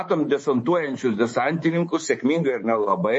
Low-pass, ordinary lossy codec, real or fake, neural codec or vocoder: 10.8 kHz; MP3, 32 kbps; fake; codec, 24 kHz, 3.1 kbps, DualCodec